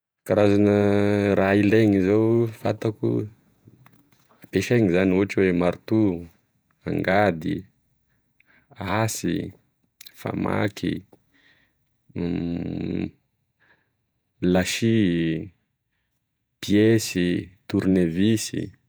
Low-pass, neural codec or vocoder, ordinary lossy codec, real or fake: none; vocoder, 48 kHz, 128 mel bands, Vocos; none; fake